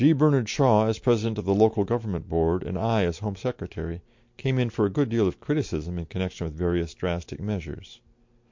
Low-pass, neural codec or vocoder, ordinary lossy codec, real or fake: 7.2 kHz; none; MP3, 48 kbps; real